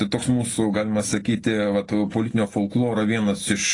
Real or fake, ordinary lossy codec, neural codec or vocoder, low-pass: real; AAC, 32 kbps; none; 10.8 kHz